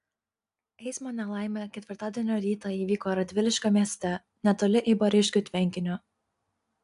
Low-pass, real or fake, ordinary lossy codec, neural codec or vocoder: 10.8 kHz; real; AAC, 64 kbps; none